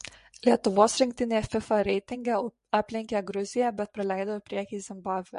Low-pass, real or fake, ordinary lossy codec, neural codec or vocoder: 10.8 kHz; fake; MP3, 48 kbps; vocoder, 24 kHz, 100 mel bands, Vocos